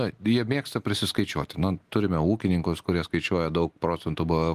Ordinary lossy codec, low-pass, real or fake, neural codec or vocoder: Opus, 24 kbps; 14.4 kHz; real; none